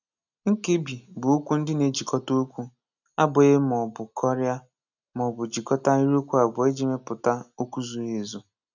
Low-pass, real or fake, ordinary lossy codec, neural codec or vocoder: 7.2 kHz; real; none; none